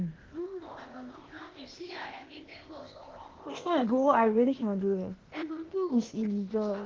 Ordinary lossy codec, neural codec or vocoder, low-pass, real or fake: Opus, 16 kbps; codec, 16 kHz, 0.8 kbps, ZipCodec; 7.2 kHz; fake